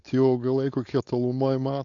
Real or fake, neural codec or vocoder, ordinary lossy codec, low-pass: fake; codec, 16 kHz, 4.8 kbps, FACodec; AAC, 48 kbps; 7.2 kHz